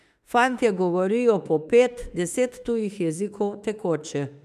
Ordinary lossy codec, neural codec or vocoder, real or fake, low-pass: none; autoencoder, 48 kHz, 32 numbers a frame, DAC-VAE, trained on Japanese speech; fake; 14.4 kHz